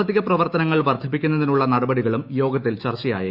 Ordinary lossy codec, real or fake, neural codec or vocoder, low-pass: Opus, 64 kbps; fake; autoencoder, 48 kHz, 128 numbers a frame, DAC-VAE, trained on Japanese speech; 5.4 kHz